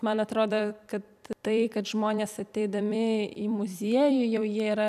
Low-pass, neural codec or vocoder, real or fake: 14.4 kHz; vocoder, 44.1 kHz, 128 mel bands every 256 samples, BigVGAN v2; fake